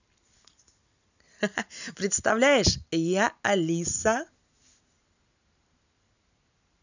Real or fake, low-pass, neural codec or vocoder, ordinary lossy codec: real; 7.2 kHz; none; none